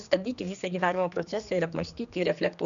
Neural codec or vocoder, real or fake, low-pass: codec, 16 kHz, 2 kbps, X-Codec, HuBERT features, trained on general audio; fake; 7.2 kHz